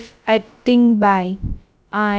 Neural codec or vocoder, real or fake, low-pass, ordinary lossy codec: codec, 16 kHz, about 1 kbps, DyCAST, with the encoder's durations; fake; none; none